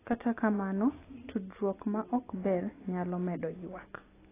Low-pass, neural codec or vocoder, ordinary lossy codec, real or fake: 3.6 kHz; none; AAC, 16 kbps; real